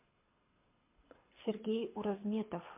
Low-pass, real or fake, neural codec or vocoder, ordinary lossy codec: 3.6 kHz; fake; codec, 24 kHz, 6 kbps, HILCodec; MP3, 24 kbps